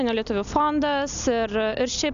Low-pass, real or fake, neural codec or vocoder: 7.2 kHz; real; none